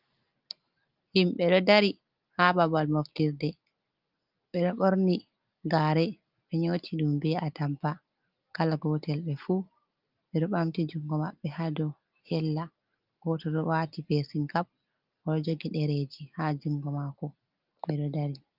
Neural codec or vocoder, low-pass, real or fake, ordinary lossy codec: none; 5.4 kHz; real; Opus, 32 kbps